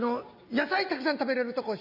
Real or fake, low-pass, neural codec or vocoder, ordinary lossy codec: real; 5.4 kHz; none; none